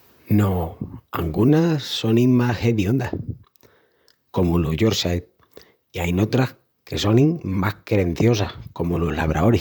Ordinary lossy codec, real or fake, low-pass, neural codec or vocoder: none; fake; none; vocoder, 44.1 kHz, 128 mel bands, Pupu-Vocoder